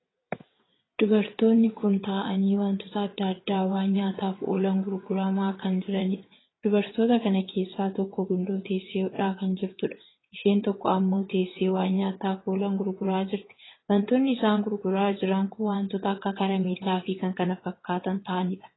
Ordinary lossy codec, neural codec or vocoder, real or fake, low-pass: AAC, 16 kbps; vocoder, 22.05 kHz, 80 mel bands, WaveNeXt; fake; 7.2 kHz